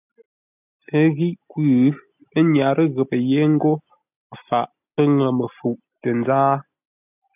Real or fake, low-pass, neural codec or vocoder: real; 3.6 kHz; none